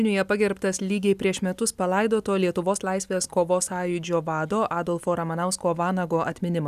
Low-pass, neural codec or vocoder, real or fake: 14.4 kHz; none; real